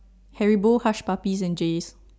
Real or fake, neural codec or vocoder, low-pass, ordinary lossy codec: real; none; none; none